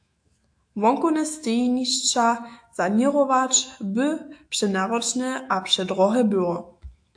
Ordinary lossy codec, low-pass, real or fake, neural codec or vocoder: AAC, 64 kbps; 9.9 kHz; fake; autoencoder, 48 kHz, 128 numbers a frame, DAC-VAE, trained on Japanese speech